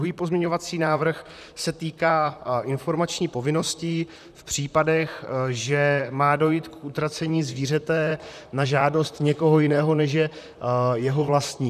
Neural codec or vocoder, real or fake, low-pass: vocoder, 44.1 kHz, 128 mel bands, Pupu-Vocoder; fake; 14.4 kHz